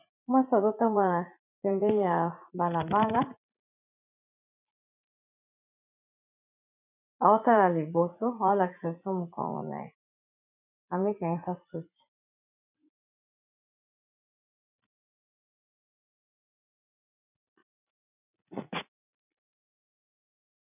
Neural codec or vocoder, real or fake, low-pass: vocoder, 44.1 kHz, 80 mel bands, Vocos; fake; 3.6 kHz